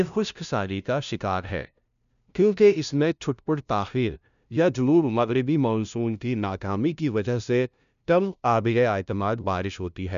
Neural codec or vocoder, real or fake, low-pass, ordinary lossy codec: codec, 16 kHz, 0.5 kbps, FunCodec, trained on LibriTTS, 25 frames a second; fake; 7.2 kHz; none